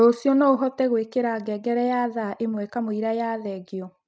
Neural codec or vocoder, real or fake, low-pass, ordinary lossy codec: none; real; none; none